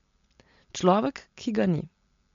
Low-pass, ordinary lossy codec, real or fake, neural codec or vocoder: 7.2 kHz; MP3, 48 kbps; real; none